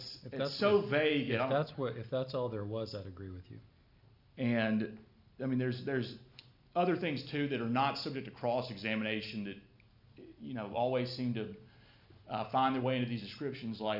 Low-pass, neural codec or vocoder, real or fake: 5.4 kHz; none; real